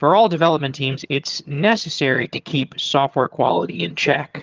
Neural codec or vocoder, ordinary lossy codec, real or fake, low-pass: vocoder, 22.05 kHz, 80 mel bands, HiFi-GAN; Opus, 24 kbps; fake; 7.2 kHz